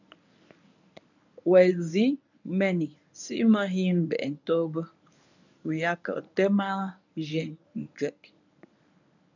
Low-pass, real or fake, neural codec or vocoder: 7.2 kHz; fake; codec, 24 kHz, 0.9 kbps, WavTokenizer, medium speech release version 1